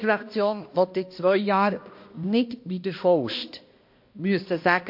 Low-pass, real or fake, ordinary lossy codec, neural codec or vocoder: 5.4 kHz; fake; MP3, 32 kbps; codec, 16 kHz, 1 kbps, X-Codec, HuBERT features, trained on balanced general audio